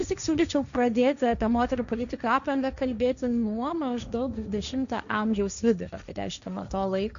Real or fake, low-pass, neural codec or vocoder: fake; 7.2 kHz; codec, 16 kHz, 1.1 kbps, Voila-Tokenizer